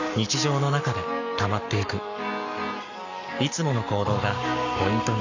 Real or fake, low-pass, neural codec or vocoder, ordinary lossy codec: fake; 7.2 kHz; codec, 44.1 kHz, 7.8 kbps, Pupu-Codec; none